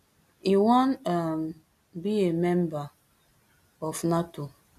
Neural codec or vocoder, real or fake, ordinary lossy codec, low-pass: none; real; none; 14.4 kHz